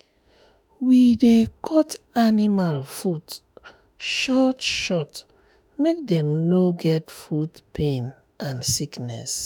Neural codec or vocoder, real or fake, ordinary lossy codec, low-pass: autoencoder, 48 kHz, 32 numbers a frame, DAC-VAE, trained on Japanese speech; fake; none; 19.8 kHz